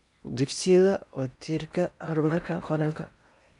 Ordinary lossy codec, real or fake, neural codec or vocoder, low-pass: none; fake; codec, 16 kHz in and 24 kHz out, 0.8 kbps, FocalCodec, streaming, 65536 codes; 10.8 kHz